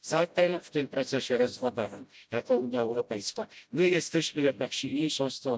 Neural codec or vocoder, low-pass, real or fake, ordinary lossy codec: codec, 16 kHz, 0.5 kbps, FreqCodec, smaller model; none; fake; none